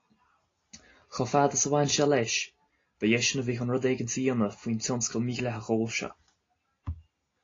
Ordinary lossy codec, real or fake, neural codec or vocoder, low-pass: AAC, 32 kbps; real; none; 7.2 kHz